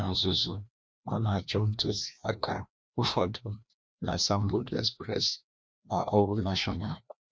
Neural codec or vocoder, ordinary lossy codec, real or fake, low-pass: codec, 16 kHz, 1 kbps, FreqCodec, larger model; none; fake; none